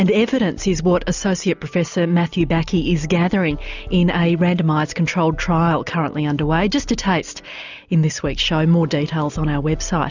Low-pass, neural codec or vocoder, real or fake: 7.2 kHz; none; real